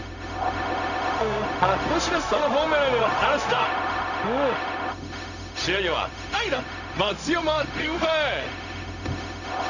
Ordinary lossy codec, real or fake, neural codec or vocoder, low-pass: none; fake; codec, 16 kHz, 0.4 kbps, LongCat-Audio-Codec; 7.2 kHz